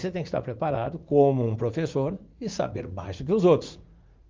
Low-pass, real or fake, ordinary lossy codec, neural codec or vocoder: 7.2 kHz; fake; Opus, 24 kbps; vocoder, 44.1 kHz, 80 mel bands, Vocos